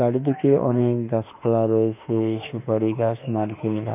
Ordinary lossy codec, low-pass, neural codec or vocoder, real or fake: none; 3.6 kHz; autoencoder, 48 kHz, 32 numbers a frame, DAC-VAE, trained on Japanese speech; fake